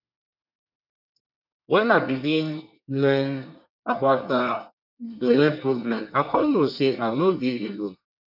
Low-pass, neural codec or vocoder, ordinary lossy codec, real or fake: 5.4 kHz; codec, 24 kHz, 1 kbps, SNAC; none; fake